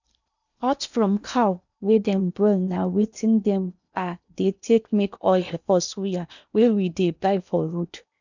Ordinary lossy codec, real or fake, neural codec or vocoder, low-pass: none; fake; codec, 16 kHz in and 24 kHz out, 0.8 kbps, FocalCodec, streaming, 65536 codes; 7.2 kHz